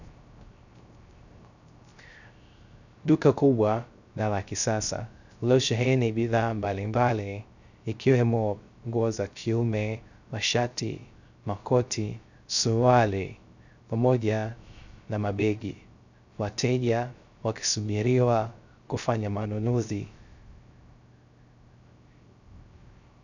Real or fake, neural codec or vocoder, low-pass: fake; codec, 16 kHz, 0.3 kbps, FocalCodec; 7.2 kHz